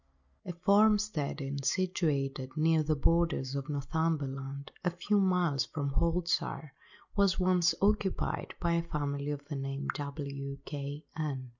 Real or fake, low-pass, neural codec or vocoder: real; 7.2 kHz; none